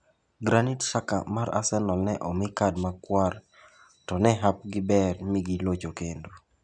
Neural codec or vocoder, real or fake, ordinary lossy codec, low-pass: none; real; none; 9.9 kHz